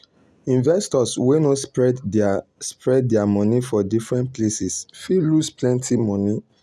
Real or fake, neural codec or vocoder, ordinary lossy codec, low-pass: real; none; none; none